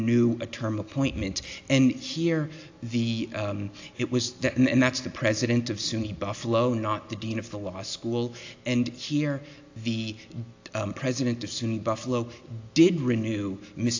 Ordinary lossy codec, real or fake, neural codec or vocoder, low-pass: AAC, 48 kbps; real; none; 7.2 kHz